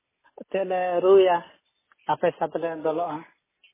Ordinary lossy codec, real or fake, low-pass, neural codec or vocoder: MP3, 16 kbps; real; 3.6 kHz; none